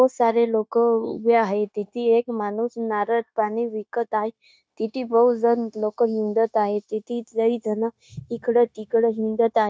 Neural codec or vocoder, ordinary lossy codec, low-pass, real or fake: codec, 16 kHz, 0.9 kbps, LongCat-Audio-Codec; none; none; fake